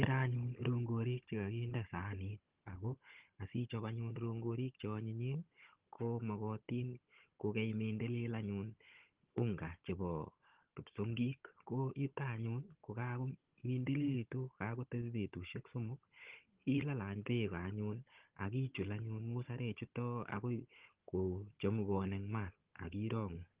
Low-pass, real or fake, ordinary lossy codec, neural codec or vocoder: 3.6 kHz; fake; Opus, 16 kbps; codec, 16 kHz, 16 kbps, FunCodec, trained on Chinese and English, 50 frames a second